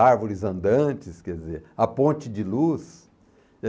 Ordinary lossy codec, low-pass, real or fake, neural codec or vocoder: none; none; real; none